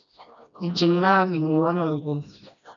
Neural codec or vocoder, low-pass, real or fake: codec, 16 kHz, 1 kbps, FreqCodec, smaller model; 7.2 kHz; fake